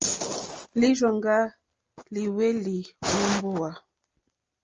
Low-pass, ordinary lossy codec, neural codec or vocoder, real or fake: 7.2 kHz; Opus, 24 kbps; none; real